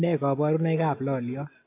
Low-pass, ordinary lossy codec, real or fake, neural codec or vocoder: 3.6 kHz; AAC, 24 kbps; real; none